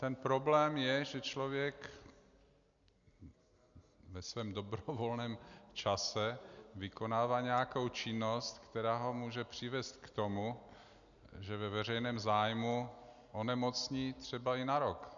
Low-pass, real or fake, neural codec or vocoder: 7.2 kHz; real; none